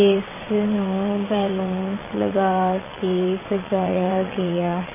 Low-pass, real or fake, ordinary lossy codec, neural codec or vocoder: 3.6 kHz; fake; MP3, 16 kbps; vocoder, 22.05 kHz, 80 mel bands, WaveNeXt